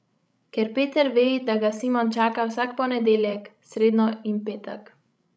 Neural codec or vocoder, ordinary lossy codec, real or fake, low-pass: codec, 16 kHz, 16 kbps, FreqCodec, larger model; none; fake; none